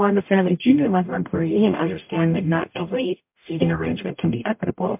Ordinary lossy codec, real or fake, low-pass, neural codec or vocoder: MP3, 32 kbps; fake; 3.6 kHz; codec, 44.1 kHz, 0.9 kbps, DAC